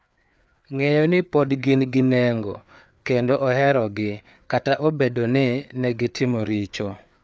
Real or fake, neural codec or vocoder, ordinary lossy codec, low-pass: fake; codec, 16 kHz, 4 kbps, FreqCodec, larger model; none; none